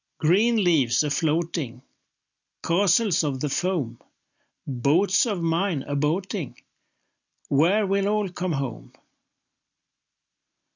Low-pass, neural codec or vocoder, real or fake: 7.2 kHz; none; real